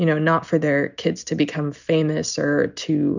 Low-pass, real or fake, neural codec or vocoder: 7.2 kHz; real; none